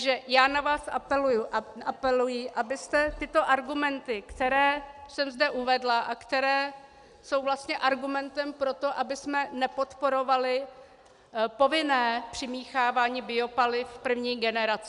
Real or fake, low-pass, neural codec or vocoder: real; 10.8 kHz; none